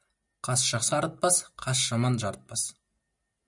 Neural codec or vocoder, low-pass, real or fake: none; 10.8 kHz; real